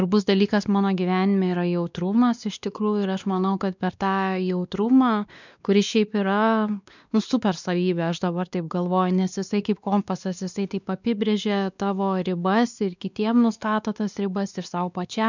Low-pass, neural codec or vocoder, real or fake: 7.2 kHz; codec, 16 kHz, 2 kbps, X-Codec, WavLM features, trained on Multilingual LibriSpeech; fake